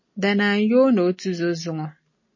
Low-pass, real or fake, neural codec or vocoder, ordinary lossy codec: 7.2 kHz; real; none; MP3, 32 kbps